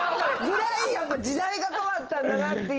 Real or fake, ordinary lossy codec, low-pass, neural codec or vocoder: real; Opus, 16 kbps; 7.2 kHz; none